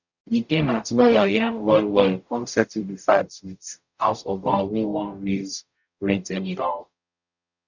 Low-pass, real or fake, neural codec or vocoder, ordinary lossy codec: 7.2 kHz; fake; codec, 44.1 kHz, 0.9 kbps, DAC; none